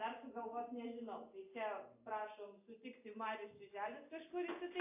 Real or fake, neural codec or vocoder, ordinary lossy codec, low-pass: real; none; AAC, 24 kbps; 3.6 kHz